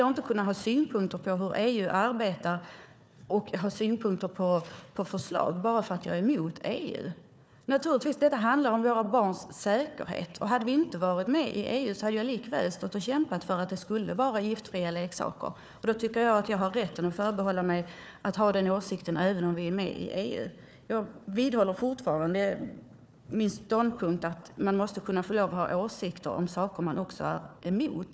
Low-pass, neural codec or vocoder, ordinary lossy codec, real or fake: none; codec, 16 kHz, 4 kbps, FunCodec, trained on Chinese and English, 50 frames a second; none; fake